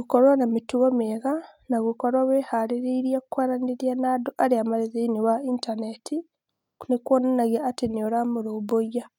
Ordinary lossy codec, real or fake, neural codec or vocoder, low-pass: none; real; none; 19.8 kHz